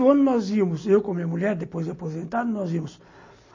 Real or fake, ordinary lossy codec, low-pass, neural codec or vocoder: real; MP3, 32 kbps; 7.2 kHz; none